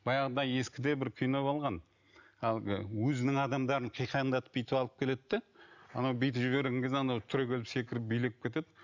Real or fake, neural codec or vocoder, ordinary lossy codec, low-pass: real; none; none; 7.2 kHz